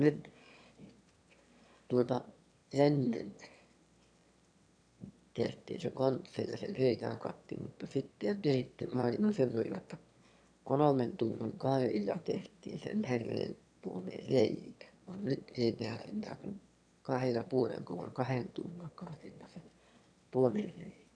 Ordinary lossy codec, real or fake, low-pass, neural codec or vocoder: none; fake; 9.9 kHz; autoencoder, 22.05 kHz, a latent of 192 numbers a frame, VITS, trained on one speaker